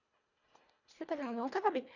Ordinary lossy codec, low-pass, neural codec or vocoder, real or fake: none; 7.2 kHz; codec, 24 kHz, 1.5 kbps, HILCodec; fake